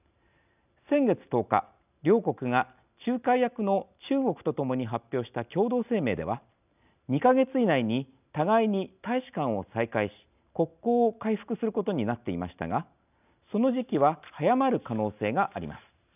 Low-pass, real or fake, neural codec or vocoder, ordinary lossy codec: 3.6 kHz; real; none; none